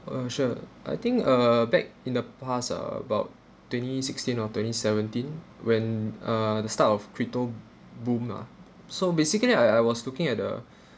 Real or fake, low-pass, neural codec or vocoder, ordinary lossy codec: real; none; none; none